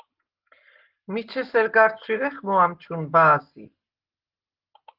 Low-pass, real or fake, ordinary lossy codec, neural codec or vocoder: 5.4 kHz; real; Opus, 16 kbps; none